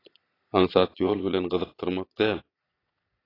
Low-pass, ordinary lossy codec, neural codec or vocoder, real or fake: 5.4 kHz; AAC, 24 kbps; vocoder, 22.05 kHz, 80 mel bands, Vocos; fake